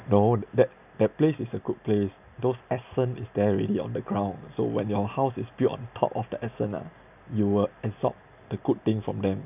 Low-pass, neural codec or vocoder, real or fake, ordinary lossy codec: 3.6 kHz; none; real; none